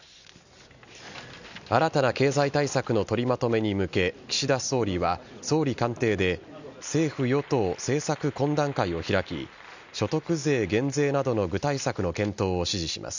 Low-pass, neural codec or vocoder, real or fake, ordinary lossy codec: 7.2 kHz; none; real; none